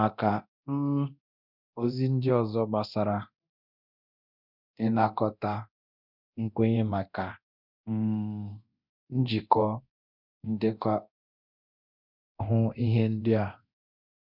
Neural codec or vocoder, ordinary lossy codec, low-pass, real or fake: codec, 24 kHz, 0.9 kbps, DualCodec; none; 5.4 kHz; fake